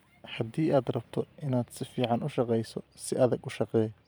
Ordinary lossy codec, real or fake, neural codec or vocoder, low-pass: none; fake; vocoder, 44.1 kHz, 128 mel bands every 512 samples, BigVGAN v2; none